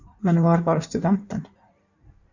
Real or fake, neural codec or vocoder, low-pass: fake; codec, 16 kHz in and 24 kHz out, 1.1 kbps, FireRedTTS-2 codec; 7.2 kHz